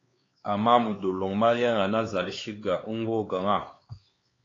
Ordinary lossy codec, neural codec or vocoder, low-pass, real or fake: AAC, 32 kbps; codec, 16 kHz, 4 kbps, X-Codec, HuBERT features, trained on LibriSpeech; 7.2 kHz; fake